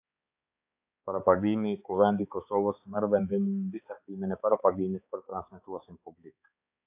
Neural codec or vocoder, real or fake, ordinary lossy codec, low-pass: codec, 16 kHz, 4 kbps, X-Codec, HuBERT features, trained on balanced general audio; fake; AAC, 32 kbps; 3.6 kHz